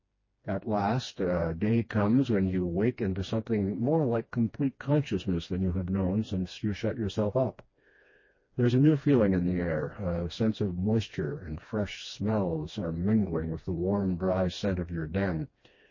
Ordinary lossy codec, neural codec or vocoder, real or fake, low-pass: MP3, 32 kbps; codec, 16 kHz, 2 kbps, FreqCodec, smaller model; fake; 7.2 kHz